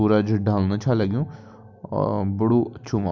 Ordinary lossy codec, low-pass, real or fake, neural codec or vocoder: none; 7.2 kHz; real; none